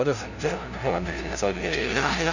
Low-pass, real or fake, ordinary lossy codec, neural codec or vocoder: 7.2 kHz; fake; none; codec, 16 kHz, 0.5 kbps, FunCodec, trained on LibriTTS, 25 frames a second